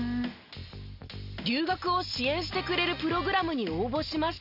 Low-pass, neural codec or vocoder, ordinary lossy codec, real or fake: 5.4 kHz; none; none; real